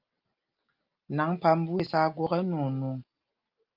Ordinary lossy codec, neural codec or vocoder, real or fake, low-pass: Opus, 24 kbps; none; real; 5.4 kHz